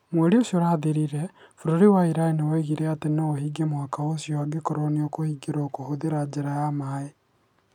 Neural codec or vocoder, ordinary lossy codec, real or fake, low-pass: none; none; real; 19.8 kHz